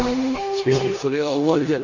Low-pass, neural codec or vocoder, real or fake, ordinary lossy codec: 7.2 kHz; codec, 16 kHz in and 24 kHz out, 0.9 kbps, LongCat-Audio-Codec, four codebook decoder; fake; none